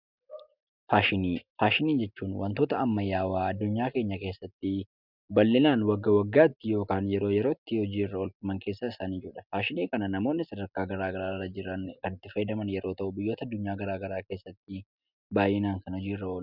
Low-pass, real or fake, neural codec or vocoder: 5.4 kHz; real; none